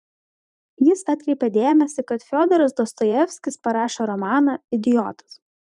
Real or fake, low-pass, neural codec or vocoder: fake; 10.8 kHz; vocoder, 44.1 kHz, 128 mel bands every 512 samples, BigVGAN v2